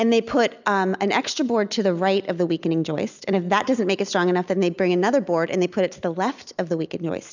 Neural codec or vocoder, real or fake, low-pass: none; real; 7.2 kHz